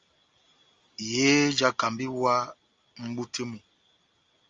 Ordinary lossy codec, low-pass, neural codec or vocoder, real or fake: Opus, 32 kbps; 7.2 kHz; none; real